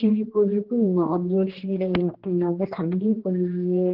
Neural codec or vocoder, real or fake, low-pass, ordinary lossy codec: codec, 16 kHz, 1 kbps, X-Codec, HuBERT features, trained on general audio; fake; 5.4 kHz; Opus, 16 kbps